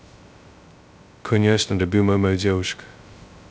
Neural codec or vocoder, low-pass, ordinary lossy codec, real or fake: codec, 16 kHz, 0.2 kbps, FocalCodec; none; none; fake